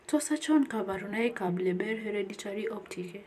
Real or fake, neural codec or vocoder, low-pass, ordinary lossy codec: fake; vocoder, 44.1 kHz, 128 mel bands every 256 samples, BigVGAN v2; 14.4 kHz; none